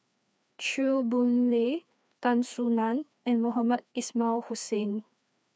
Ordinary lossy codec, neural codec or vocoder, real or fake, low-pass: none; codec, 16 kHz, 2 kbps, FreqCodec, larger model; fake; none